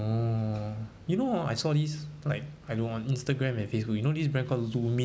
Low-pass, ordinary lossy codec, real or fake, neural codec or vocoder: none; none; real; none